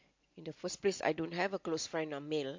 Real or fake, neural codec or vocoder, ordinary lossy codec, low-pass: real; none; MP3, 48 kbps; 7.2 kHz